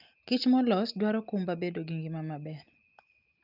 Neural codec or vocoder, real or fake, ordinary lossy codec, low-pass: none; real; Opus, 24 kbps; 5.4 kHz